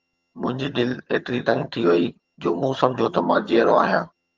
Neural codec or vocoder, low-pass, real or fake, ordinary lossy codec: vocoder, 22.05 kHz, 80 mel bands, HiFi-GAN; 7.2 kHz; fake; Opus, 32 kbps